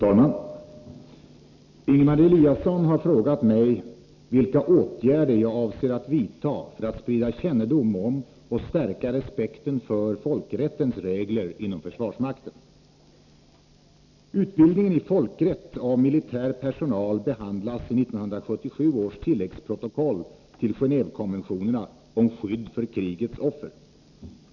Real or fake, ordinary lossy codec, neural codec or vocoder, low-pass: real; none; none; 7.2 kHz